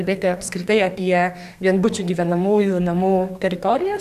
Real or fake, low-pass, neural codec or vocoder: fake; 14.4 kHz; codec, 32 kHz, 1.9 kbps, SNAC